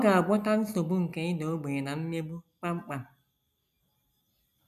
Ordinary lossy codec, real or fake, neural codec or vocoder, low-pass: none; real; none; 14.4 kHz